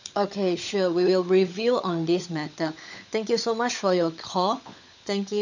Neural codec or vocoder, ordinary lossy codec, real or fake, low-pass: codec, 16 kHz, 16 kbps, FunCodec, trained on LibriTTS, 50 frames a second; none; fake; 7.2 kHz